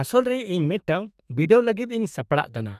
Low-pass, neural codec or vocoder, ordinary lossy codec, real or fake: 14.4 kHz; codec, 32 kHz, 1.9 kbps, SNAC; none; fake